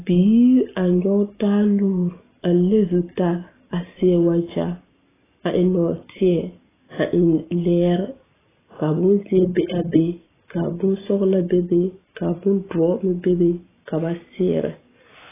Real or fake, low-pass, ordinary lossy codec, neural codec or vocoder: real; 3.6 kHz; AAC, 16 kbps; none